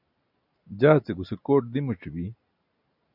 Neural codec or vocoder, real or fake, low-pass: none; real; 5.4 kHz